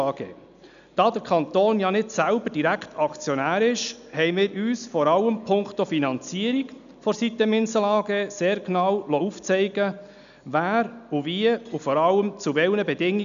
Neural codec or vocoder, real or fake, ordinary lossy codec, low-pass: none; real; MP3, 96 kbps; 7.2 kHz